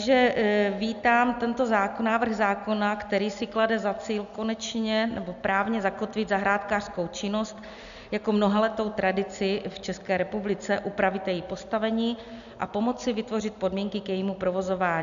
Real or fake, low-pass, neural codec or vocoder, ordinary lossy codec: real; 7.2 kHz; none; MP3, 96 kbps